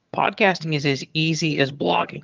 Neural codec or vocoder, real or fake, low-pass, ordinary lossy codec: vocoder, 22.05 kHz, 80 mel bands, HiFi-GAN; fake; 7.2 kHz; Opus, 24 kbps